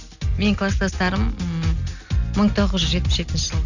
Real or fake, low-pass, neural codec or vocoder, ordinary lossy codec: real; 7.2 kHz; none; none